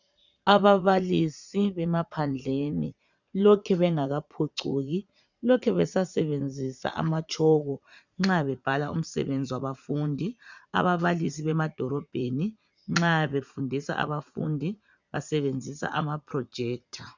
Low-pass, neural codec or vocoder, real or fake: 7.2 kHz; vocoder, 44.1 kHz, 80 mel bands, Vocos; fake